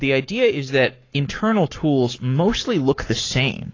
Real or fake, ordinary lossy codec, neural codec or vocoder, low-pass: fake; AAC, 32 kbps; codec, 44.1 kHz, 7.8 kbps, Pupu-Codec; 7.2 kHz